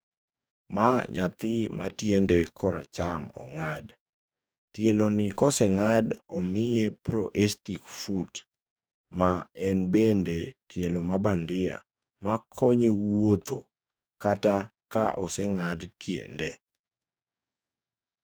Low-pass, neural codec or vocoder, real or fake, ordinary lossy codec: none; codec, 44.1 kHz, 2.6 kbps, DAC; fake; none